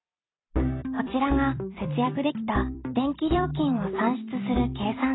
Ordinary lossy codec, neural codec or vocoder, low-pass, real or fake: AAC, 16 kbps; vocoder, 44.1 kHz, 128 mel bands every 256 samples, BigVGAN v2; 7.2 kHz; fake